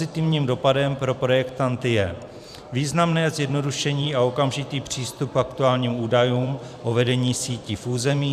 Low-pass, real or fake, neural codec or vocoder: 14.4 kHz; fake; vocoder, 44.1 kHz, 128 mel bands every 512 samples, BigVGAN v2